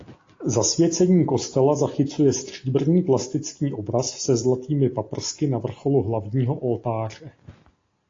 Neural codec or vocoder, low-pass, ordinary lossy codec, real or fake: none; 7.2 kHz; AAC, 32 kbps; real